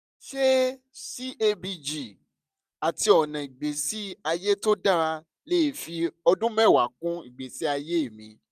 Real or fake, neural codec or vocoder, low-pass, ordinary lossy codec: real; none; 14.4 kHz; none